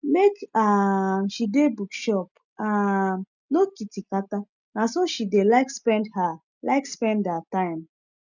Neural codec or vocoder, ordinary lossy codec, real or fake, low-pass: none; none; real; 7.2 kHz